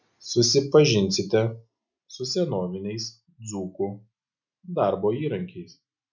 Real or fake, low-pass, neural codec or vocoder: real; 7.2 kHz; none